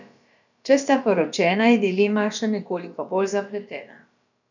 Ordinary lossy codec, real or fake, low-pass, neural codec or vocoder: none; fake; 7.2 kHz; codec, 16 kHz, about 1 kbps, DyCAST, with the encoder's durations